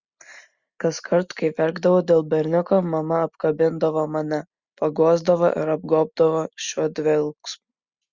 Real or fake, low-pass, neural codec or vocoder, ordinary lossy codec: real; 7.2 kHz; none; Opus, 64 kbps